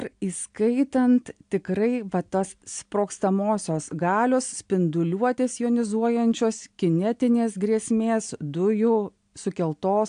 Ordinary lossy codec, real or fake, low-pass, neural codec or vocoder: AAC, 64 kbps; real; 9.9 kHz; none